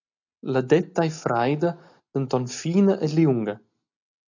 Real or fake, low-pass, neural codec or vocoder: real; 7.2 kHz; none